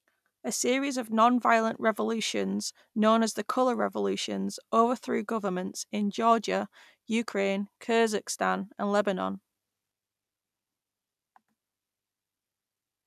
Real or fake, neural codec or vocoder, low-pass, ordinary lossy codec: real; none; 14.4 kHz; none